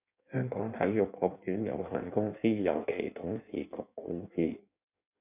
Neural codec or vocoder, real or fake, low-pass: codec, 16 kHz in and 24 kHz out, 1.1 kbps, FireRedTTS-2 codec; fake; 3.6 kHz